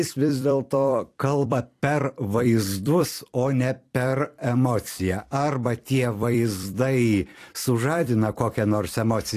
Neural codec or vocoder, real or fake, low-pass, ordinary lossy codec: vocoder, 44.1 kHz, 128 mel bands every 256 samples, BigVGAN v2; fake; 14.4 kHz; AAC, 64 kbps